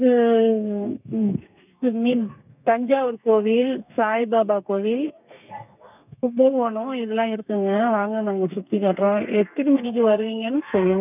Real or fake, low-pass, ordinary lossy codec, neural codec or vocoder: fake; 3.6 kHz; none; codec, 32 kHz, 1.9 kbps, SNAC